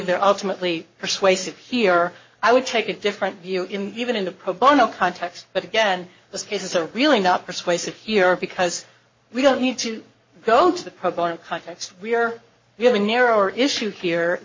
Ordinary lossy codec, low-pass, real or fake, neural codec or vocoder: MP3, 32 kbps; 7.2 kHz; fake; codec, 44.1 kHz, 7.8 kbps, Pupu-Codec